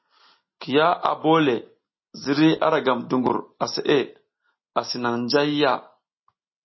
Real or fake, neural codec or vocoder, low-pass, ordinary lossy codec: real; none; 7.2 kHz; MP3, 24 kbps